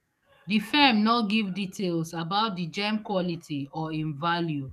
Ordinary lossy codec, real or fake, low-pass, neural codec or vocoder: none; fake; 14.4 kHz; codec, 44.1 kHz, 7.8 kbps, DAC